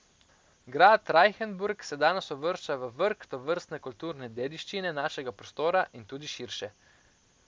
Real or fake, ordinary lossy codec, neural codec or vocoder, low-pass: real; none; none; none